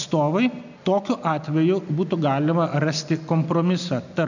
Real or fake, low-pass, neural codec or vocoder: real; 7.2 kHz; none